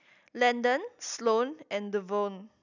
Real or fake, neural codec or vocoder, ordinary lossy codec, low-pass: real; none; none; 7.2 kHz